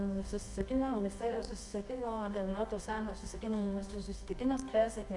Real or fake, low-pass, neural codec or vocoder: fake; 10.8 kHz; codec, 24 kHz, 0.9 kbps, WavTokenizer, medium music audio release